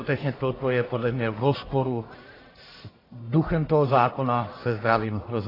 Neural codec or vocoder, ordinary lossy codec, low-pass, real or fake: codec, 44.1 kHz, 1.7 kbps, Pupu-Codec; AAC, 24 kbps; 5.4 kHz; fake